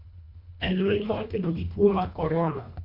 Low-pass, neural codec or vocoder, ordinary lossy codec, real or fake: 5.4 kHz; codec, 24 kHz, 1.5 kbps, HILCodec; none; fake